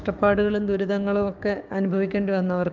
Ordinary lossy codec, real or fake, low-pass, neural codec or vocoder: Opus, 24 kbps; fake; 7.2 kHz; codec, 16 kHz, 6 kbps, DAC